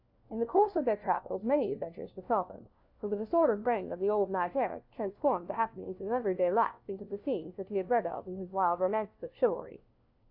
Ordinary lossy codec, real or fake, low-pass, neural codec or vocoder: AAC, 48 kbps; fake; 5.4 kHz; codec, 16 kHz, 1 kbps, FunCodec, trained on LibriTTS, 50 frames a second